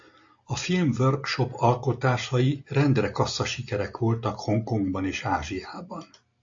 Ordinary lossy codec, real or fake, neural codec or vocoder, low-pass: AAC, 64 kbps; real; none; 7.2 kHz